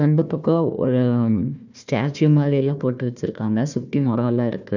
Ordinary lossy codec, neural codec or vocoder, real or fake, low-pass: none; codec, 16 kHz, 1 kbps, FunCodec, trained on Chinese and English, 50 frames a second; fake; 7.2 kHz